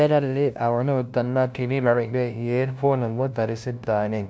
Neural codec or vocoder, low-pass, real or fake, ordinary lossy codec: codec, 16 kHz, 0.5 kbps, FunCodec, trained on LibriTTS, 25 frames a second; none; fake; none